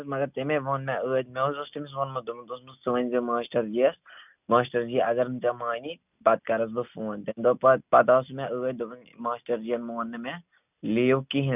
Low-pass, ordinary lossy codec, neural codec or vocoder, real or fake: 3.6 kHz; none; none; real